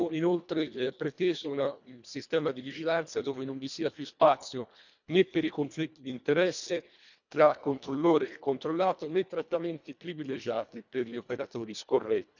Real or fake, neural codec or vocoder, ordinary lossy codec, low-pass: fake; codec, 24 kHz, 1.5 kbps, HILCodec; none; 7.2 kHz